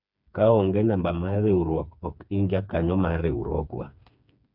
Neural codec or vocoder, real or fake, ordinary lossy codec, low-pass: codec, 16 kHz, 4 kbps, FreqCodec, smaller model; fake; none; 5.4 kHz